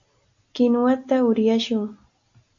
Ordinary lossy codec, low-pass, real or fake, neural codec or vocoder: AAC, 48 kbps; 7.2 kHz; real; none